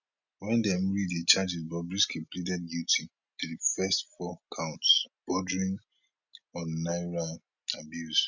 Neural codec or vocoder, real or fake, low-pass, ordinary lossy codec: none; real; 7.2 kHz; none